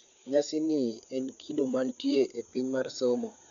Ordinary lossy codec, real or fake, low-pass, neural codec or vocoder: none; fake; 7.2 kHz; codec, 16 kHz, 4 kbps, FreqCodec, larger model